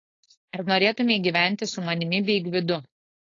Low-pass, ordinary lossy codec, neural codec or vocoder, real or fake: 7.2 kHz; AAC, 32 kbps; codec, 16 kHz, 4.8 kbps, FACodec; fake